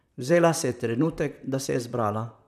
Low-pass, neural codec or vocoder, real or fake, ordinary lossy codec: 14.4 kHz; vocoder, 44.1 kHz, 128 mel bands, Pupu-Vocoder; fake; none